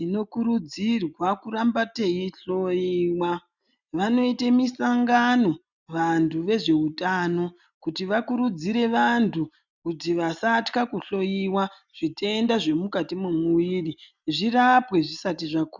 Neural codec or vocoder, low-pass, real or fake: none; 7.2 kHz; real